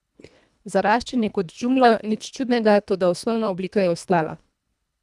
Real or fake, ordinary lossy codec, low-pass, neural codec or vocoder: fake; none; none; codec, 24 kHz, 1.5 kbps, HILCodec